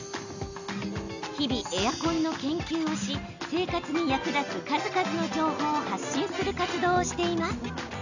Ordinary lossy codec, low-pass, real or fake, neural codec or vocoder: none; 7.2 kHz; real; none